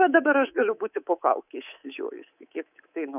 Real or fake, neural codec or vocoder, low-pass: fake; codec, 24 kHz, 3.1 kbps, DualCodec; 3.6 kHz